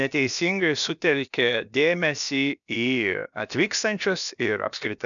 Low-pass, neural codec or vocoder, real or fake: 7.2 kHz; codec, 16 kHz, about 1 kbps, DyCAST, with the encoder's durations; fake